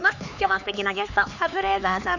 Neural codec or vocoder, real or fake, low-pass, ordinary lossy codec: codec, 16 kHz, 4 kbps, X-Codec, HuBERT features, trained on LibriSpeech; fake; 7.2 kHz; none